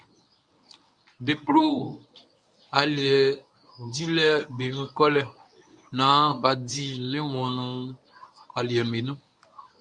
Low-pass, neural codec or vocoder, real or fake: 9.9 kHz; codec, 24 kHz, 0.9 kbps, WavTokenizer, medium speech release version 1; fake